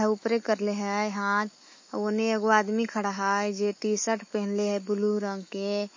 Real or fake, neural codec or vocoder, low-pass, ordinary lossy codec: fake; autoencoder, 48 kHz, 128 numbers a frame, DAC-VAE, trained on Japanese speech; 7.2 kHz; MP3, 32 kbps